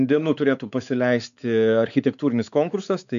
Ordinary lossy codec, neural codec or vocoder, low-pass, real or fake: MP3, 96 kbps; codec, 16 kHz, 4 kbps, X-Codec, WavLM features, trained on Multilingual LibriSpeech; 7.2 kHz; fake